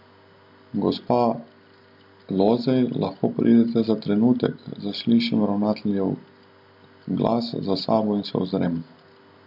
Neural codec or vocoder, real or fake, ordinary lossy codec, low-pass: none; real; MP3, 48 kbps; 5.4 kHz